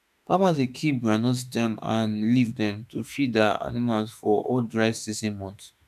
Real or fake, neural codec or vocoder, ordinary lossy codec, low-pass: fake; autoencoder, 48 kHz, 32 numbers a frame, DAC-VAE, trained on Japanese speech; none; 14.4 kHz